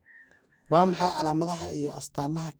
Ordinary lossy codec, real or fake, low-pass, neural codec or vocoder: none; fake; none; codec, 44.1 kHz, 2.6 kbps, DAC